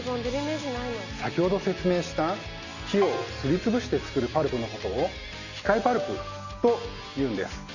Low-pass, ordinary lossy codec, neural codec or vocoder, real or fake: 7.2 kHz; none; none; real